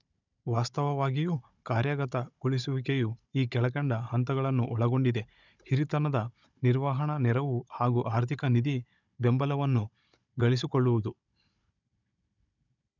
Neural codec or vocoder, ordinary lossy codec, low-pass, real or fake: codec, 16 kHz, 16 kbps, FunCodec, trained on Chinese and English, 50 frames a second; none; 7.2 kHz; fake